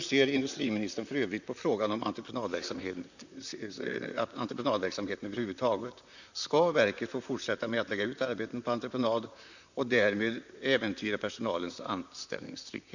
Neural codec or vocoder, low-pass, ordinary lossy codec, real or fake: vocoder, 44.1 kHz, 128 mel bands, Pupu-Vocoder; 7.2 kHz; none; fake